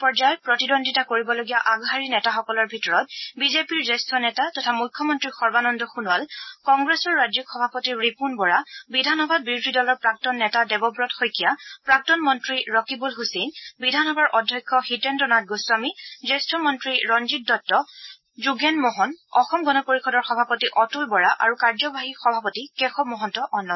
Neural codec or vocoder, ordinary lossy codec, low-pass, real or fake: none; MP3, 24 kbps; 7.2 kHz; real